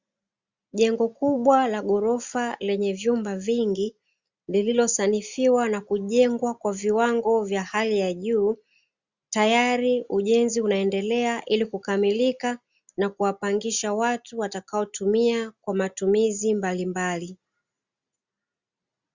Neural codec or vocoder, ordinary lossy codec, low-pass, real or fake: none; Opus, 64 kbps; 7.2 kHz; real